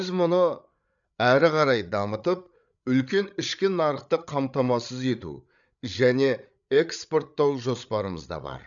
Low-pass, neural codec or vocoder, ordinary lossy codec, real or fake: 7.2 kHz; codec, 16 kHz, 8 kbps, FreqCodec, larger model; none; fake